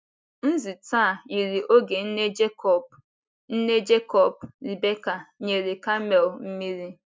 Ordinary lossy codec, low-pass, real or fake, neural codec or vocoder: none; none; real; none